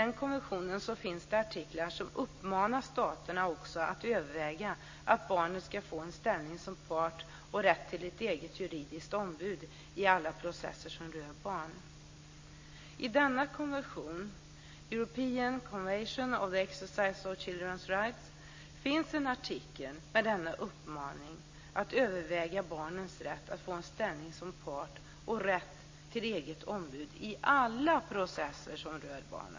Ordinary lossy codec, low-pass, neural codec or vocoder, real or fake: MP3, 32 kbps; 7.2 kHz; none; real